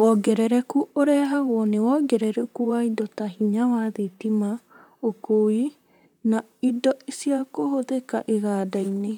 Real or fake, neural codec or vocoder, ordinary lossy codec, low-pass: fake; vocoder, 44.1 kHz, 128 mel bands, Pupu-Vocoder; none; 19.8 kHz